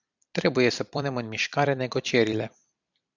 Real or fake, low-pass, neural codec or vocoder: fake; 7.2 kHz; vocoder, 44.1 kHz, 128 mel bands every 256 samples, BigVGAN v2